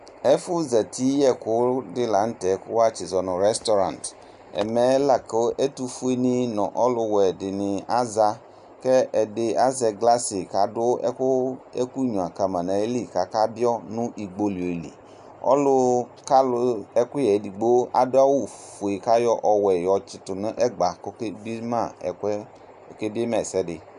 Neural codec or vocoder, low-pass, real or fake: none; 10.8 kHz; real